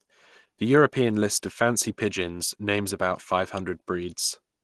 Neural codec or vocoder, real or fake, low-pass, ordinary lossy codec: none; real; 10.8 kHz; Opus, 16 kbps